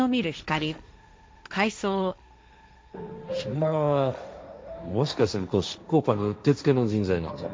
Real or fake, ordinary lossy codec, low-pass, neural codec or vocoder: fake; none; none; codec, 16 kHz, 1.1 kbps, Voila-Tokenizer